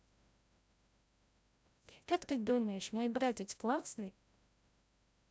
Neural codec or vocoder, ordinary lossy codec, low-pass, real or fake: codec, 16 kHz, 0.5 kbps, FreqCodec, larger model; none; none; fake